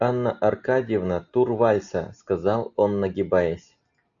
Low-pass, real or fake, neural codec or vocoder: 7.2 kHz; real; none